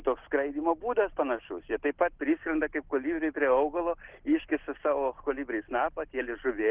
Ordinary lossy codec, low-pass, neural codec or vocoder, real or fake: Opus, 16 kbps; 3.6 kHz; none; real